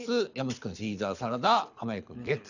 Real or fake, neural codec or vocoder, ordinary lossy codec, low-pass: fake; codec, 24 kHz, 6 kbps, HILCodec; none; 7.2 kHz